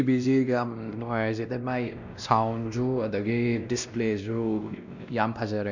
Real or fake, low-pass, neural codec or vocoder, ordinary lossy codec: fake; 7.2 kHz; codec, 16 kHz, 1 kbps, X-Codec, WavLM features, trained on Multilingual LibriSpeech; none